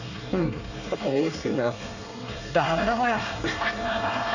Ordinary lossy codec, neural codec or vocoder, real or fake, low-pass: none; codec, 24 kHz, 1 kbps, SNAC; fake; 7.2 kHz